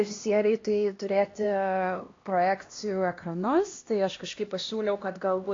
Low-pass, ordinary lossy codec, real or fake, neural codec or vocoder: 7.2 kHz; AAC, 32 kbps; fake; codec, 16 kHz, 1 kbps, X-Codec, HuBERT features, trained on LibriSpeech